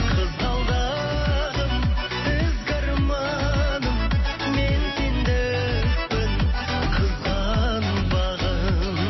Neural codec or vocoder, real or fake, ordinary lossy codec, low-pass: none; real; MP3, 24 kbps; 7.2 kHz